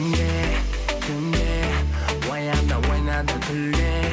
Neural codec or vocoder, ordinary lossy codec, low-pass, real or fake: none; none; none; real